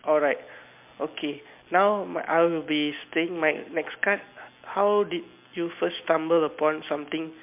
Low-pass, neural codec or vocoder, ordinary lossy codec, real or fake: 3.6 kHz; none; MP3, 32 kbps; real